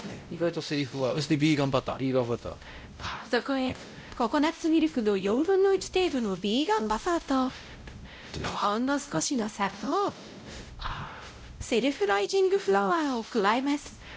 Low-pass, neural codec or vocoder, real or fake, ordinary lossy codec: none; codec, 16 kHz, 0.5 kbps, X-Codec, WavLM features, trained on Multilingual LibriSpeech; fake; none